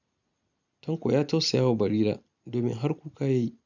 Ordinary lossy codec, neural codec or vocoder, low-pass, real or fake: none; none; 7.2 kHz; real